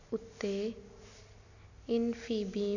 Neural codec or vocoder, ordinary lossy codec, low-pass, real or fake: none; none; 7.2 kHz; real